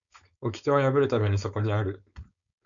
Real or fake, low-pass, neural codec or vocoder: fake; 7.2 kHz; codec, 16 kHz, 4.8 kbps, FACodec